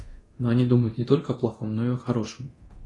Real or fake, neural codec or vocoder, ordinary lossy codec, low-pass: fake; codec, 24 kHz, 0.9 kbps, DualCodec; AAC, 32 kbps; 10.8 kHz